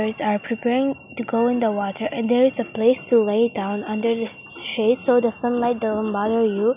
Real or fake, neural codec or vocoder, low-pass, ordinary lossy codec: real; none; 3.6 kHz; none